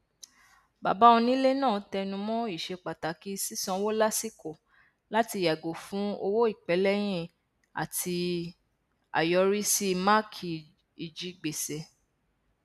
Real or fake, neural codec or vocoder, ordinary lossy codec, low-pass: real; none; none; 14.4 kHz